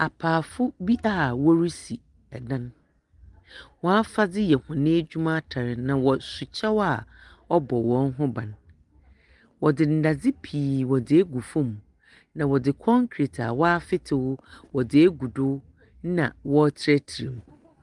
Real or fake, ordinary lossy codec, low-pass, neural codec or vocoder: real; Opus, 24 kbps; 10.8 kHz; none